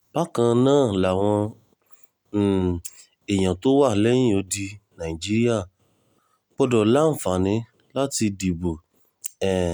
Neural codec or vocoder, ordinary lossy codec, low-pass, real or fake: none; none; none; real